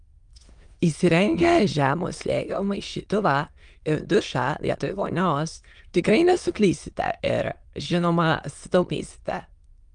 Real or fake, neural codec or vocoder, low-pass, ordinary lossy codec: fake; autoencoder, 22.05 kHz, a latent of 192 numbers a frame, VITS, trained on many speakers; 9.9 kHz; Opus, 32 kbps